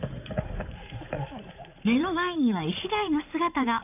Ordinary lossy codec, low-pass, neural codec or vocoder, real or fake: none; 3.6 kHz; codec, 16 kHz in and 24 kHz out, 2.2 kbps, FireRedTTS-2 codec; fake